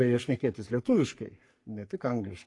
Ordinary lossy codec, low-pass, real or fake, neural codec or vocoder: AAC, 48 kbps; 10.8 kHz; fake; codec, 44.1 kHz, 3.4 kbps, Pupu-Codec